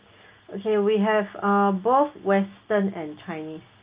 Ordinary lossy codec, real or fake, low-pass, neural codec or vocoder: Opus, 24 kbps; real; 3.6 kHz; none